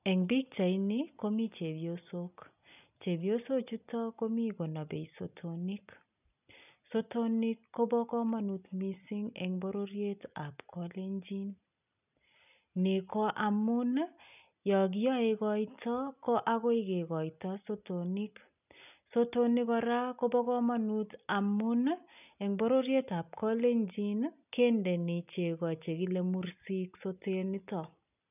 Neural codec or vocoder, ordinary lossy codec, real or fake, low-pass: none; none; real; 3.6 kHz